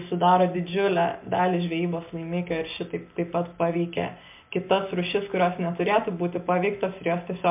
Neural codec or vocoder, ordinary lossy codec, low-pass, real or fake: none; MP3, 32 kbps; 3.6 kHz; real